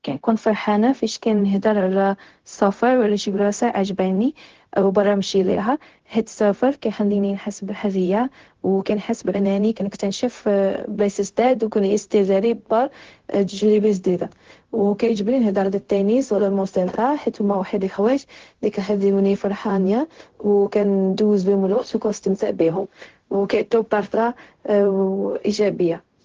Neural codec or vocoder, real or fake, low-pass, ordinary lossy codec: codec, 16 kHz, 0.4 kbps, LongCat-Audio-Codec; fake; 7.2 kHz; Opus, 16 kbps